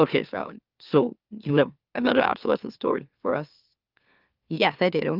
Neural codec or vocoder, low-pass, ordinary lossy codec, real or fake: autoencoder, 44.1 kHz, a latent of 192 numbers a frame, MeloTTS; 5.4 kHz; Opus, 24 kbps; fake